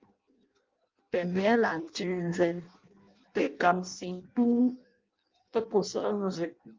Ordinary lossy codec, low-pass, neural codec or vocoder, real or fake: Opus, 24 kbps; 7.2 kHz; codec, 16 kHz in and 24 kHz out, 0.6 kbps, FireRedTTS-2 codec; fake